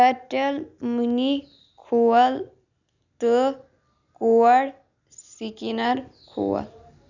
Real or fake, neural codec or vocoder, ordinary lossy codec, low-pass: real; none; none; 7.2 kHz